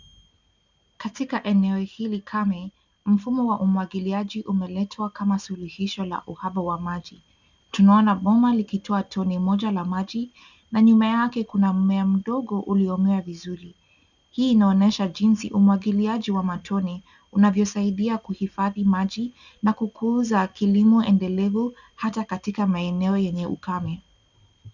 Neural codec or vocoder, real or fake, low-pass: none; real; 7.2 kHz